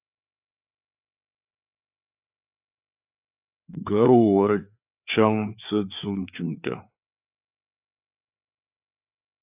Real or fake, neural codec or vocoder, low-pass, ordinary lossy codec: fake; codec, 16 kHz, 4 kbps, FreqCodec, larger model; 3.6 kHz; AAC, 32 kbps